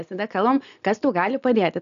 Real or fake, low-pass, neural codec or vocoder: real; 7.2 kHz; none